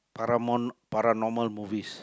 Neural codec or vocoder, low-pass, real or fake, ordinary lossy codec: none; none; real; none